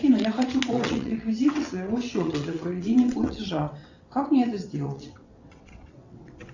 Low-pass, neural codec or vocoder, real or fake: 7.2 kHz; vocoder, 22.05 kHz, 80 mel bands, WaveNeXt; fake